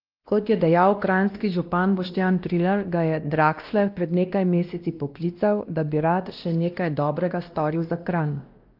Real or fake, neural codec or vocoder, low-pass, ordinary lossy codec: fake; codec, 16 kHz, 1 kbps, X-Codec, WavLM features, trained on Multilingual LibriSpeech; 5.4 kHz; Opus, 24 kbps